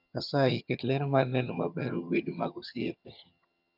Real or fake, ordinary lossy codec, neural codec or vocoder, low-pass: fake; none; vocoder, 22.05 kHz, 80 mel bands, HiFi-GAN; 5.4 kHz